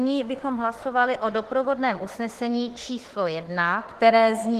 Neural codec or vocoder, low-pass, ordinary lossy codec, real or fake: autoencoder, 48 kHz, 32 numbers a frame, DAC-VAE, trained on Japanese speech; 14.4 kHz; Opus, 16 kbps; fake